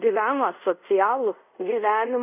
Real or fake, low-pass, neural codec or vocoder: fake; 3.6 kHz; codec, 24 kHz, 0.5 kbps, DualCodec